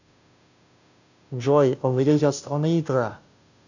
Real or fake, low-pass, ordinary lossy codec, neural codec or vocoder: fake; 7.2 kHz; none; codec, 16 kHz, 0.5 kbps, FunCodec, trained on Chinese and English, 25 frames a second